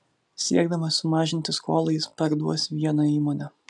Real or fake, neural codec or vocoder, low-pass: real; none; 10.8 kHz